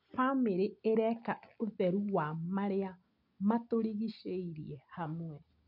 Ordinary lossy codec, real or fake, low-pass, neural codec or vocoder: none; real; 5.4 kHz; none